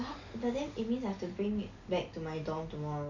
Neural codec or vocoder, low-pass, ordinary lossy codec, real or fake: none; 7.2 kHz; none; real